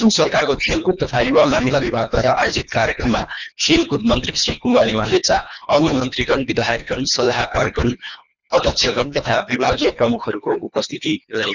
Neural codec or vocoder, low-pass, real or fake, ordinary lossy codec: codec, 24 kHz, 1.5 kbps, HILCodec; 7.2 kHz; fake; none